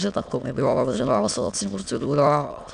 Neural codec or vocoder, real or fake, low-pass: autoencoder, 22.05 kHz, a latent of 192 numbers a frame, VITS, trained on many speakers; fake; 9.9 kHz